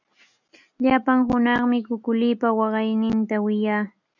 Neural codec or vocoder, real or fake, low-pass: none; real; 7.2 kHz